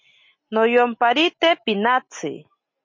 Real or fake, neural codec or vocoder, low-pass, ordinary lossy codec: real; none; 7.2 kHz; MP3, 32 kbps